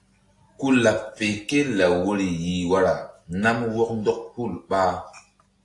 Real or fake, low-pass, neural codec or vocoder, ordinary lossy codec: real; 10.8 kHz; none; AAC, 48 kbps